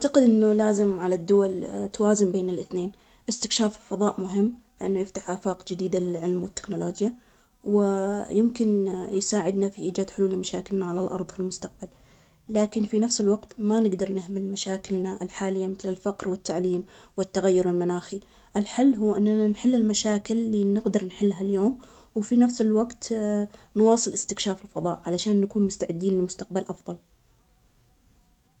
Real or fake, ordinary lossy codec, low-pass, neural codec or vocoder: fake; none; 19.8 kHz; codec, 44.1 kHz, 7.8 kbps, DAC